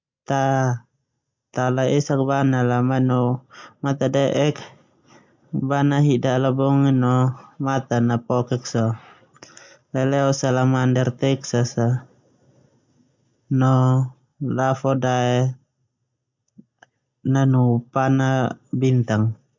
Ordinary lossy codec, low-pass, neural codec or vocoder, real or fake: MP3, 64 kbps; 7.2 kHz; none; real